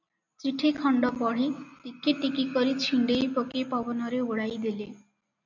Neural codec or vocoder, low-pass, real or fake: none; 7.2 kHz; real